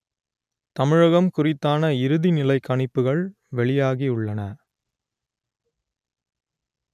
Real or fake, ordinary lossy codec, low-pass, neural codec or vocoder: real; none; 14.4 kHz; none